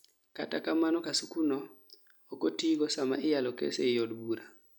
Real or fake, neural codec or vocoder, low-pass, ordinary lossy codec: real; none; 19.8 kHz; none